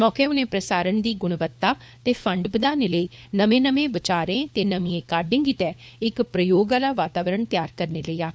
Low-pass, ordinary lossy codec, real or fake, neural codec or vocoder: none; none; fake; codec, 16 kHz, 4 kbps, FunCodec, trained on LibriTTS, 50 frames a second